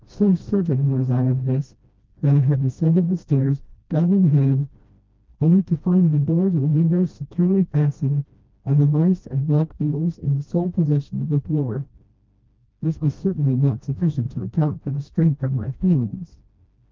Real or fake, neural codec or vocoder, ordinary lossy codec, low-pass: fake; codec, 16 kHz, 1 kbps, FreqCodec, smaller model; Opus, 24 kbps; 7.2 kHz